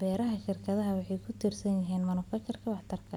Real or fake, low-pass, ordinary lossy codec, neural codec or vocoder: real; 19.8 kHz; none; none